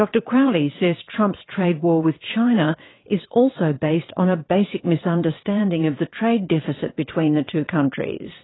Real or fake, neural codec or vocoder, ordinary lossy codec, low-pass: fake; vocoder, 22.05 kHz, 80 mel bands, WaveNeXt; AAC, 16 kbps; 7.2 kHz